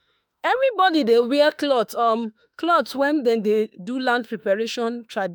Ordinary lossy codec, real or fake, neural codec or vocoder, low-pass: none; fake; autoencoder, 48 kHz, 32 numbers a frame, DAC-VAE, trained on Japanese speech; none